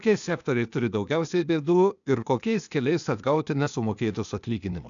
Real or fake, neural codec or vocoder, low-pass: fake; codec, 16 kHz, 0.8 kbps, ZipCodec; 7.2 kHz